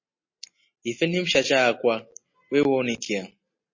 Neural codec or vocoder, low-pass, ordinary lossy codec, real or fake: none; 7.2 kHz; MP3, 32 kbps; real